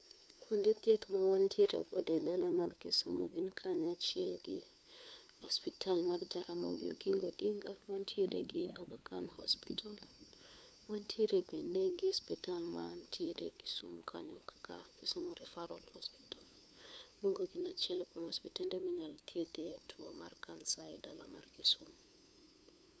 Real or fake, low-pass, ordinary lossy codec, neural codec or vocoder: fake; none; none; codec, 16 kHz, 2 kbps, FunCodec, trained on LibriTTS, 25 frames a second